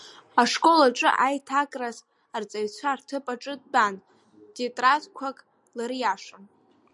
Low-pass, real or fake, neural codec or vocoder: 10.8 kHz; real; none